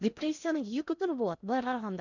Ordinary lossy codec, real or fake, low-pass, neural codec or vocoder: none; fake; 7.2 kHz; codec, 16 kHz in and 24 kHz out, 0.8 kbps, FocalCodec, streaming, 65536 codes